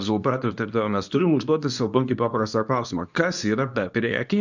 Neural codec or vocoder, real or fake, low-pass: codec, 24 kHz, 0.9 kbps, WavTokenizer, medium speech release version 2; fake; 7.2 kHz